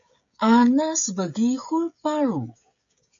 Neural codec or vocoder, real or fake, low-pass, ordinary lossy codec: codec, 16 kHz, 16 kbps, FreqCodec, smaller model; fake; 7.2 kHz; MP3, 48 kbps